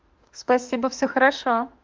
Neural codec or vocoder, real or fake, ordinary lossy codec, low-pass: autoencoder, 48 kHz, 32 numbers a frame, DAC-VAE, trained on Japanese speech; fake; Opus, 24 kbps; 7.2 kHz